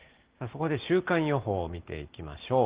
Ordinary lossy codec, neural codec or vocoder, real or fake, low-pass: Opus, 16 kbps; none; real; 3.6 kHz